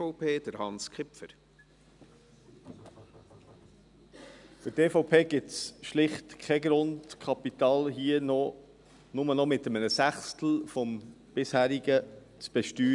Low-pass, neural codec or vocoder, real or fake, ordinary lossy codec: 10.8 kHz; none; real; none